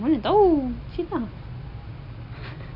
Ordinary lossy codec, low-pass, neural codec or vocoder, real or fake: none; 5.4 kHz; none; real